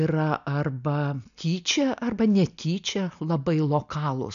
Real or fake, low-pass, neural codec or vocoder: real; 7.2 kHz; none